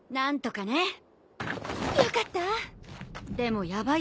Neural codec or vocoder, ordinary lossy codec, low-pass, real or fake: none; none; none; real